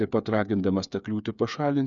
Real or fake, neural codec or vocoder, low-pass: fake; codec, 16 kHz, 8 kbps, FreqCodec, smaller model; 7.2 kHz